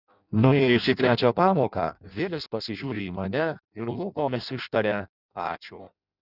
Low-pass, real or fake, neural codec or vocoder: 5.4 kHz; fake; codec, 16 kHz in and 24 kHz out, 0.6 kbps, FireRedTTS-2 codec